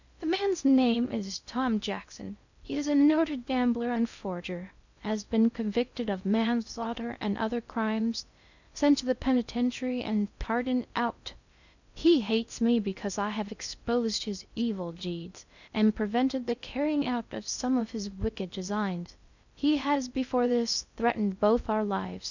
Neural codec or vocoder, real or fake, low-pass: codec, 16 kHz in and 24 kHz out, 0.6 kbps, FocalCodec, streaming, 2048 codes; fake; 7.2 kHz